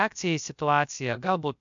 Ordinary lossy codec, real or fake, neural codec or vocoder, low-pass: MP3, 64 kbps; fake; codec, 16 kHz, about 1 kbps, DyCAST, with the encoder's durations; 7.2 kHz